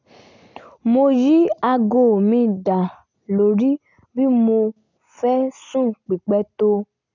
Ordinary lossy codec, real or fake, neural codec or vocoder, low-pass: none; real; none; 7.2 kHz